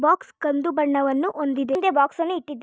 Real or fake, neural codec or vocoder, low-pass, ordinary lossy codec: real; none; none; none